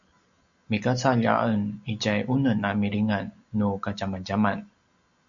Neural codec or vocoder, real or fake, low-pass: none; real; 7.2 kHz